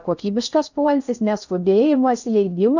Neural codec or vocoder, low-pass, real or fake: codec, 16 kHz in and 24 kHz out, 0.6 kbps, FocalCodec, streaming, 4096 codes; 7.2 kHz; fake